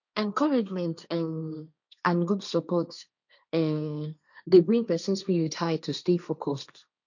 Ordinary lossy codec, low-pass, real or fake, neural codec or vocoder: none; 7.2 kHz; fake; codec, 16 kHz, 1.1 kbps, Voila-Tokenizer